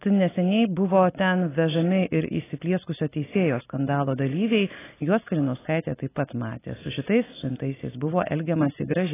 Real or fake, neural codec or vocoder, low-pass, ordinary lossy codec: real; none; 3.6 kHz; AAC, 16 kbps